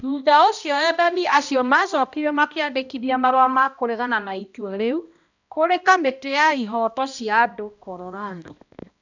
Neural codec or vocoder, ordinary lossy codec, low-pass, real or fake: codec, 16 kHz, 1 kbps, X-Codec, HuBERT features, trained on balanced general audio; none; 7.2 kHz; fake